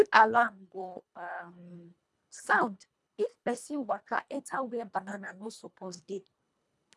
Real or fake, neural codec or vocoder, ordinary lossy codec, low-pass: fake; codec, 24 kHz, 1.5 kbps, HILCodec; none; none